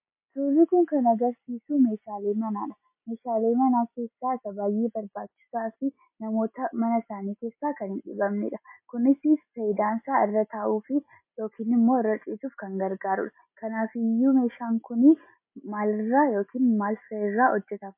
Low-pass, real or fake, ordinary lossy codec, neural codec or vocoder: 3.6 kHz; real; MP3, 24 kbps; none